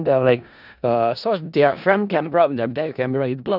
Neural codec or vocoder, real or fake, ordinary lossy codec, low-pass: codec, 16 kHz in and 24 kHz out, 0.4 kbps, LongCat-Audio-Codec, four codebook decoder; fake; none; 5.4 kHz